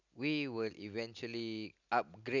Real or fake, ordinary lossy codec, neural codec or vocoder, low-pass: real; none; none; 7.2 kHz